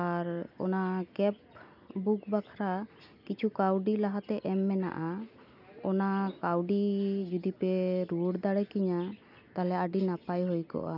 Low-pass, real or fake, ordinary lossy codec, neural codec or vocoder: 5.4 kHz; real; none; none